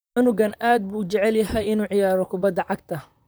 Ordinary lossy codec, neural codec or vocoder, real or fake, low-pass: none; vocoder, 44.1 kHz, 128 mel bands, Pupu-Vocoder; fake; none